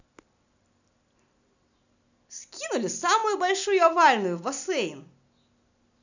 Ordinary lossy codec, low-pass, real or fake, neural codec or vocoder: none; 7.2 kHz; real; none